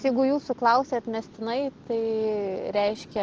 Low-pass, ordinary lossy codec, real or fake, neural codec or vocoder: 7.2 kHz; Opus, 16 kbps; real; none